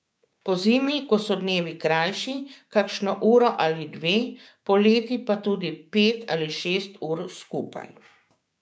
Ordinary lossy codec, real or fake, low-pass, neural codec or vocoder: none; fake; none; codec, 16 kHz, 6 kbps, DAC